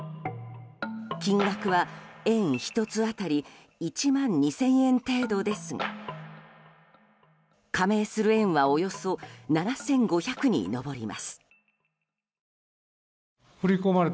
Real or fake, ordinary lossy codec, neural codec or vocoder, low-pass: real; none; none; none